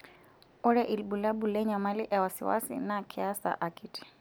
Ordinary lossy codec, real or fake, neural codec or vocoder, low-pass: none; real; none; none